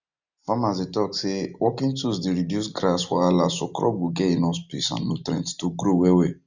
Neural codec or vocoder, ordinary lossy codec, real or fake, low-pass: none; none; real; 7.2 kHz